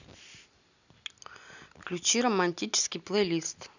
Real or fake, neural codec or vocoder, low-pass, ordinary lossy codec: real; none; 7.2 kHz; none